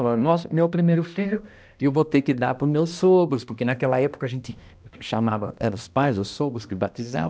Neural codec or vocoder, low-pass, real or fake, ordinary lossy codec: codec, 16 kHz, 1 kbps, X-Codec, HuBERT features, trained on balanced general audio; none; fake; none